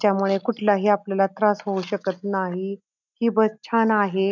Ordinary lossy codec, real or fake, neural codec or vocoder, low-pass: none; real; none; 7.2 kHz